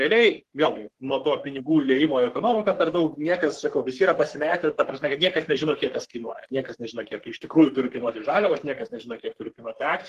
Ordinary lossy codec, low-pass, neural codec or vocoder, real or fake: Opus, 16 kbps; 14.4 kHz; codec, 44.1 kHz, 3.4 kbps, Pupu-Codec; fake